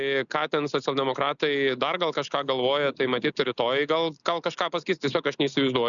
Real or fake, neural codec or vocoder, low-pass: real; none; 7.2 kHz